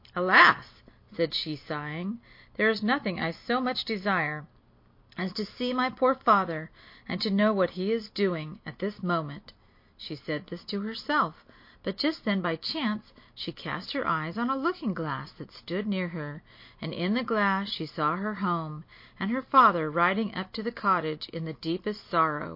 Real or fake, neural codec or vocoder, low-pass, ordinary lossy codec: real; none; 5.4 kHz; MP3, 32 kbps